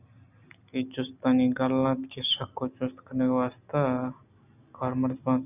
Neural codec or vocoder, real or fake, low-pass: none; real; 3.6 kHz